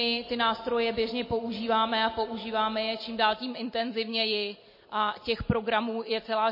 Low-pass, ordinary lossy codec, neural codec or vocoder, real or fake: 5.4 kHz; MP3, 24 kbps; none; real